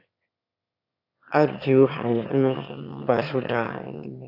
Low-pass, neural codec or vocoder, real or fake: 5.4 kHz; autoencoder, 22.05 kHz, a latent of 192 numbers a frame, VITS, trained on one speaker; fake